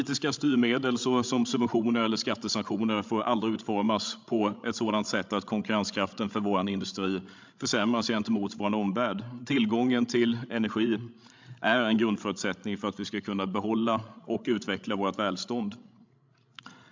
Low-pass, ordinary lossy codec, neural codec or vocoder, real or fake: 7.2 kHz; MP3, 64 kbps; codec, 16 kHz, 16 kbps, FreqCodec, larger model; fake